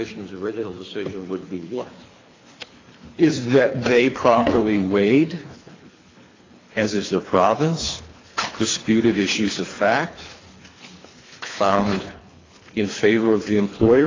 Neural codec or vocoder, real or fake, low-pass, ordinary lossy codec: codec, 24 kHz, 3 kbps, HILCodec; fake; 7.2 kHz; AAC, 32 kbps